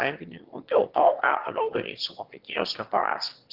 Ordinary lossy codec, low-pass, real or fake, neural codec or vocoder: Opus, 16 kbps; 5.4 kHz; fake; autoencoder, 22.05 kHz, a latent of 192 numbers a frame, VITS, trained on one speaker